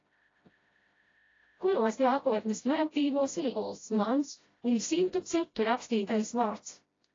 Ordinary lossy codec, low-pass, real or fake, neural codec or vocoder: AAC, 32 kbps; 7.2 kHz; fake; codec, 16 kHz, 0.5 kbps, FreqCodec, smaller model